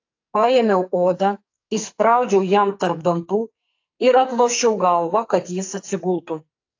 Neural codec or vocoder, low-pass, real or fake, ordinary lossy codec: codec, 44.1 kHz, 2.6 kbps, SNAC; 7.2 kHz; fake; AAC, 48 kbps